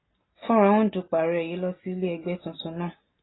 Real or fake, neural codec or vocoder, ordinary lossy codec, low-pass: real; none; AAC, 16 kbps; 7.2 kHz